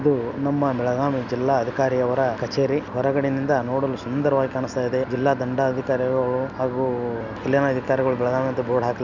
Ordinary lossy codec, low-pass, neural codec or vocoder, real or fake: none; 7.2 kHz; none; real